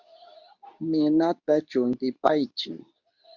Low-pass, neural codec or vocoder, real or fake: 7.2 kHz; codec, 24 kHz, 0.9 kbps, WavTokenizer, medium speech release version 2; fake